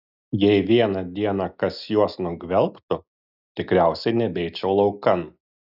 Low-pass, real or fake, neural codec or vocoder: 5.4 kHz; real; none